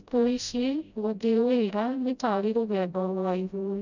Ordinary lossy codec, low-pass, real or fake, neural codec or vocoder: none; 7.2 kHz; fake; codec, 16 kHz, 0.5 kbps, FreqCodec, smaller model